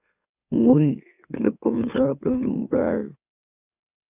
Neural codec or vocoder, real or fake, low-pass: autoencoder, 44.1 kHz, a latent of 192 numbers a frame, MeloTTS; fake; 3.6 kHz